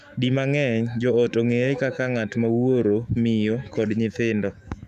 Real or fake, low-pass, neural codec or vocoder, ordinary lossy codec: fake; 14.4 kHz; autoencoder, 48 kHz, 128 numbers a frame, DAC-VAE, trained on Japanese speech; none